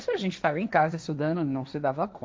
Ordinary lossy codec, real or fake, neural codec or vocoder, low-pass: none; fake; codec, 16 kHz, 1.1 kbps, Voila-Tokenizer; none